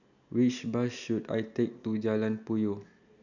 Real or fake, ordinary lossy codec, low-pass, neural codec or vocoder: real; none; 7.2 kHz; none